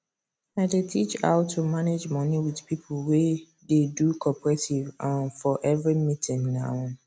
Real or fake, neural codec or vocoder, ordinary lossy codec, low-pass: real; none; none; none